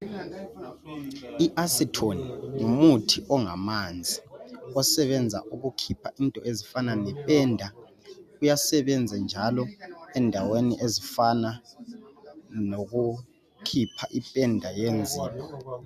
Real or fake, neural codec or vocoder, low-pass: real; none; 14.4 kHz